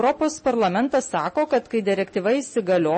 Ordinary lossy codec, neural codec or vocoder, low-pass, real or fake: MP3, 32 kbps; none; 10.8 kHz; real